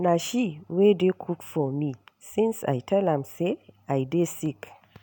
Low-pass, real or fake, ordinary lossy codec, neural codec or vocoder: none; real; none; none